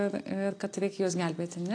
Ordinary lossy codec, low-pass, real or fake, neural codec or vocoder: AAC, 48 kbps; 9.9 kHz; real; none